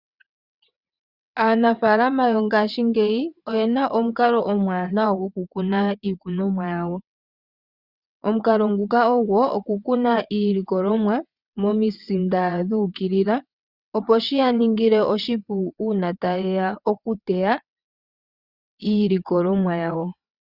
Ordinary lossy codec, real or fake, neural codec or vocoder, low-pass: Opus, 64 kbps; fake; vocoder, 22.05 kHz, 80 mel bands, WaveNeXt; 5.4 kHz